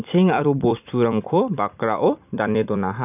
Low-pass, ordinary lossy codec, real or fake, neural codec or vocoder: 3.6 kHz; none; fake; vocoder, 44.1 kHz, 128 mel bands every 256 samples, BigVGAN v2